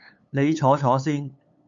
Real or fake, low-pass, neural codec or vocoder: fake; 7.2 kHz; codec, 16 kHz, 8 kbps, FunCodec, trained on LibriTTS, 25 frames a second